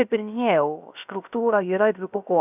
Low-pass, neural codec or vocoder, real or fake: 3.6 kHz; codec, 16 kHz, 0.3 kbps, FocalCodec; fake